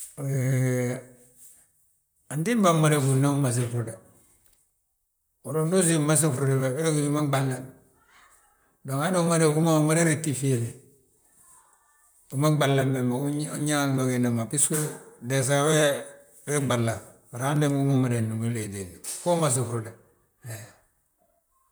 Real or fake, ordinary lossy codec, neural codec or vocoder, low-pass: fake; none; vocoder, 44.1 kHz, 128 mel bands, Pupu-Vocoder; none